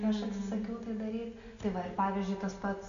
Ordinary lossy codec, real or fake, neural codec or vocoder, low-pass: AAC, 96 kbps; real; none; 7.2 kHz